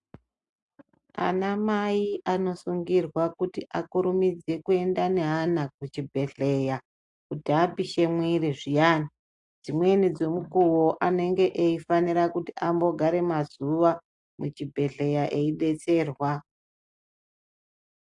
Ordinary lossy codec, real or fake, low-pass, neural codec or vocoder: AAC, 64 kbps; real; 10.8 kHz; none